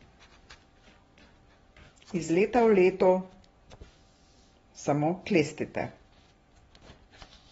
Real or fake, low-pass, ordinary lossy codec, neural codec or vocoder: fake; 10.8 kHz; AAC, 24 kbps; vocoder, 24 kHz, 100 mel bands, Vocos